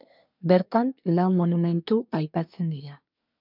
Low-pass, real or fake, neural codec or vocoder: 5.4 kHz; fake; codec, 24 kHz, 1 kbps, SNAC